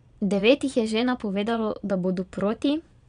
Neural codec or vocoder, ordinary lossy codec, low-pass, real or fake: vocoder, 22.05 kHz, 80 mel bands, Vocos; none; 9.9 kHz; fake